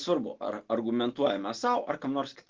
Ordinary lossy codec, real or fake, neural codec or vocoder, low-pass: Opus, 16 kbps; real; none; 7.2 kHz